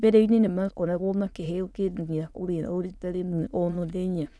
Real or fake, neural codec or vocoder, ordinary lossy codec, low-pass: fake; autoencoder, 22.05 kHz, a latent of 192 numbers a frame, VITS, trained on many speakers; none; none